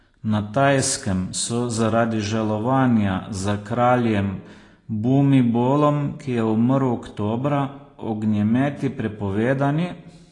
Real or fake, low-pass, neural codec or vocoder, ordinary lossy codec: real; 10.8 kHz; none; AAC, 32 kbps